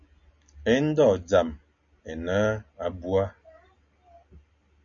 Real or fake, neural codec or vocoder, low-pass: real; none; 7.2 kHz